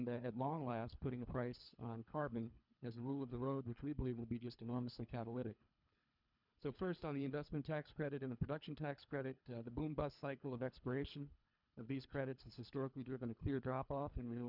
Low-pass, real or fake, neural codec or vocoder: 5.4 kHz; fake; codec, 24 kHz, 3 kbps, HILCodec